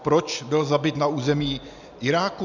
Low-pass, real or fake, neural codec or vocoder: 7.2 kHz; real; none